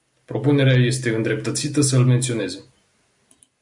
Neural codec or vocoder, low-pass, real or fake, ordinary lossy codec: none; 10.8 kHz; real; MP3, 64 kbps